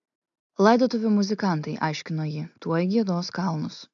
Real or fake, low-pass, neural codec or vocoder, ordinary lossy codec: real; 7.2 kHz; none; AAC, 64 kbps